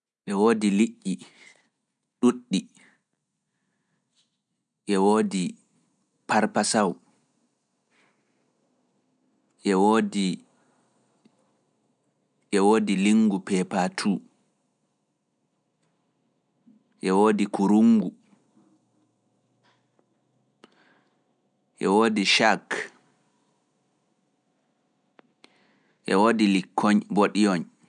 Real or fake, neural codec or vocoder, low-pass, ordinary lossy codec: real; none; 9.9 kHz; none